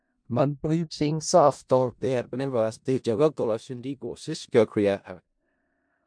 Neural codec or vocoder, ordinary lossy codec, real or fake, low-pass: codec, 16 kHz in and 24 kHz out, 0.4 kbps, LongCat-Audio-Codec, four codebook decoder; MP3, 64 kbps; fake; 9.9 kHz